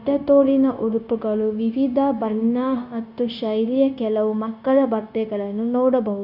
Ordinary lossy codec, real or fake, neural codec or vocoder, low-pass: none; fake; codec, 16 kHz, 0.9 kbps, LongCat-Audio-Codec; 5.4 kHz